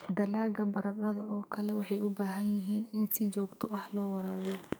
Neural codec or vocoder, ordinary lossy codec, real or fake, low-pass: codec, 44.1 kHz, 2.6 kbps, SNAC; none; fake; none